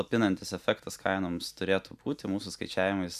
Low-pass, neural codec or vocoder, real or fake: 14.4 kHz; none; real